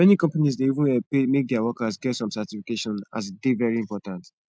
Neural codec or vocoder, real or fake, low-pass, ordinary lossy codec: none; real; none; none